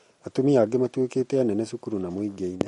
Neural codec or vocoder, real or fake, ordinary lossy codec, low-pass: none; real; MP3, 48 kbps; 19.8 kHz